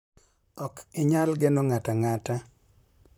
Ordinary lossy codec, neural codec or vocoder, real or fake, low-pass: none; vocoder, 44.1 kHz, 128 mel bands, Pupu-Vocoder; fake; none